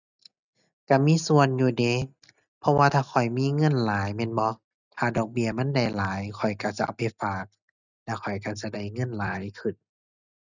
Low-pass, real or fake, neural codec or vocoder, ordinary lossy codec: 7.2 kHz; real; none; none